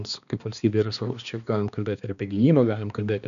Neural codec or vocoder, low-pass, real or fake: codec, 16 kHz, 2 kbps, X-Codec, HuBERT features, trained on balanced general audio; 7.2 kHz; fake